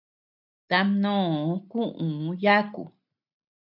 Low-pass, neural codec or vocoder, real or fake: 5.4 kHz; none; real